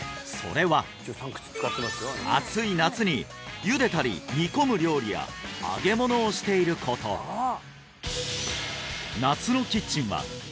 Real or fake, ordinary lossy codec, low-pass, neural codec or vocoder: real; none; none; none